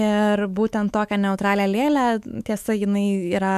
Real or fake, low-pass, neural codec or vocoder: fake; 14.4 kHz; autoencoder, 48 kHz, 128 numbers a frame, DAC-VAE, trained on Japanese speech